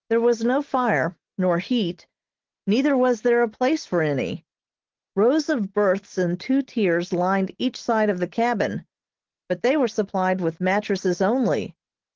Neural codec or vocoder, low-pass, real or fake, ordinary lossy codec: none; 7.2 kHz; real; Opus, 16 kbps